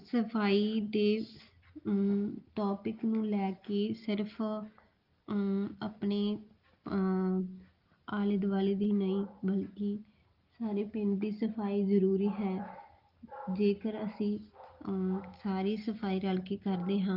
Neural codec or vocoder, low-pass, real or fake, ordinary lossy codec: none; 5.4 kHz; real; Opus, 32 kbps